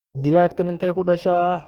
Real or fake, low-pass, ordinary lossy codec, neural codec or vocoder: fake; 19.8 kHz; Opus, 64 kbps; codec, 44.1 kHz, 2.6 kbps, DAC